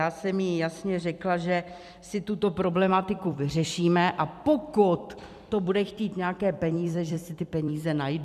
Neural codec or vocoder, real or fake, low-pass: vocoder, 44.1 kHz, 128 mel bands every 256 samples, BigVGAN v2; fake; 14.4 kHz